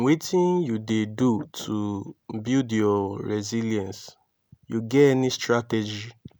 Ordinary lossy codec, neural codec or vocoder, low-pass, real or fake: none; none; none; real